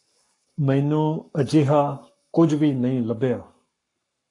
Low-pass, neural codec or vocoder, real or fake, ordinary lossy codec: 10.8 kHz; codec, 44.1 kHz, 7.8 kbps, Pupu-Codec; fake; AAC, 48 kbps